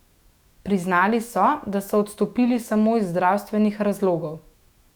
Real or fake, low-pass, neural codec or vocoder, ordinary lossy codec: fake; 19.8 kHz; autoencoder, 48 kHz, 128 numbers a frame, DAC-VAE, trained on Japanese speech; Opus, 64 kbps